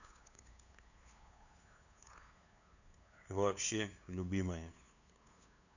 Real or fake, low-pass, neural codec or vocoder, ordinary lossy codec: fake; 7.2 kHz; codec, 16 kHz, 2 kbps, FunCodec, trained on LibriTTS, 25 frames a second; none